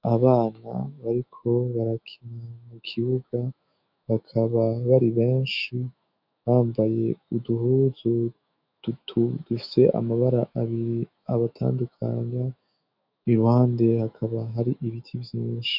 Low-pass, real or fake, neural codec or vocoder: 5.4 kHz; fake; autoencoder, 48 kHz, 128 numbers a frame, DAC-VAE, trained on Japanese speech